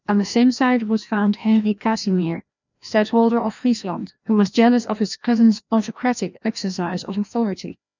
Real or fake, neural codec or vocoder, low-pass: fake; codec, 16 kHz, 1 kbps, FreqCodec, larger model; 7.2 kHz